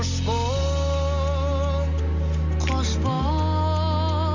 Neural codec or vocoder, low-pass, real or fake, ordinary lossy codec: none; 7.2 kHz; real; none